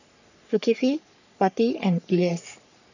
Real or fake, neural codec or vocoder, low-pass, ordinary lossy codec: fake; codec, 44.1 kHz, 3.4 kbps, Pupu-Codec; 7.2 kHz; none